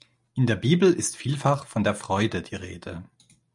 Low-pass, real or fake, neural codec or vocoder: 10.8 kHz; real; none